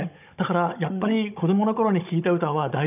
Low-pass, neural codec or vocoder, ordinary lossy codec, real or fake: 3.6 kHz; codec, 16 kHz, 16 kbps, FunCodec, trained on Chinese and English, 50 frames a second; none; fake